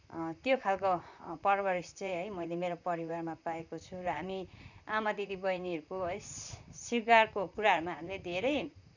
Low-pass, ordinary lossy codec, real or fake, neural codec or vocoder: 7.2 kHz; none; fake; vocoder, 44.1 kHz, 128 mel bands, Pupu-Vocoder